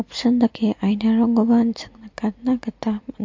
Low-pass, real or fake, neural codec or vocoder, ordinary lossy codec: 7.2 kHz; real; none; MP3, 48 kbps